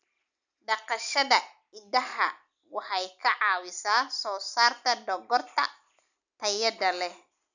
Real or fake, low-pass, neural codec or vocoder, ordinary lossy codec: real; 7.2 kHz; none; none